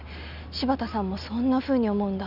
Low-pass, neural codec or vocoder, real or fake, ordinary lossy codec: 5.4 kHz; none; real; none